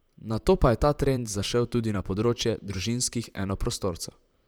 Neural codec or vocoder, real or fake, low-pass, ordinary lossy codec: vocoder, 44.1 kHz, 128 mel bands, Pupu-Vocoder; fake; none; none